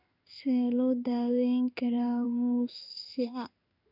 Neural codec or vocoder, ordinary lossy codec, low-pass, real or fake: codec, 16 kHz in and 24 kHz out, 1 kbps, XY-Tokenizer; none; 5.4 kHz; fake